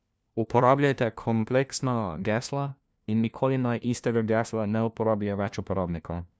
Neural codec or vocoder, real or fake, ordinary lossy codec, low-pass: codec, 16 kHz, 1 kbps, FunCodec, trained on LibriTTS, 50 frames a second; fake; none; none